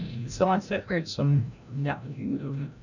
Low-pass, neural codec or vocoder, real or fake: 7.2 kHz; codec, 16 kHz, 0.5 kbps, FreqCodec, larger model; fake